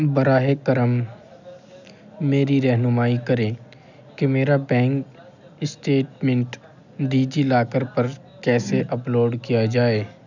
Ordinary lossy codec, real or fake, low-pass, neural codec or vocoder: none; real; 7.2 kHz; none